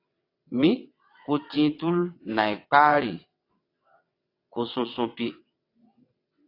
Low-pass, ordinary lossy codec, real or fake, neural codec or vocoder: 5.4 kHz; AAC, 32 kbps; fake; vocoder, 22.05 kHz, 80 mel bands, WaveNeXt